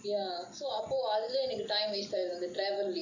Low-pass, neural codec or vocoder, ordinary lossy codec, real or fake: 7.2 kHz; none; AAC, 48 kbps; real